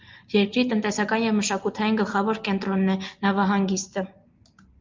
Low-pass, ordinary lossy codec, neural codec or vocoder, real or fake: 7.2 kHz; Opus, 24 kbps; none; real